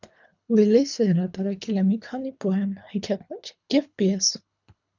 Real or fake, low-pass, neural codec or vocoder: fake; 7.2 kHz; codec, 24 kHz, 3 kbps, HILCodec